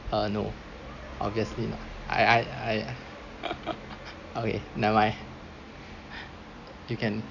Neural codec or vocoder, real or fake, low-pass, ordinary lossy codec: none; real; 7.2 kHz; none